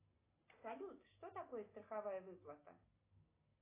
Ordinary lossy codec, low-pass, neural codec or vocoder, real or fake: AAC, 24 kbps; 3.6 kHz; none; real